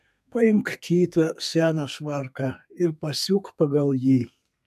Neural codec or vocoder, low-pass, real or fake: codec, 32 kHz, 1.9 kbps, SNAC; 14.4 kHz; fake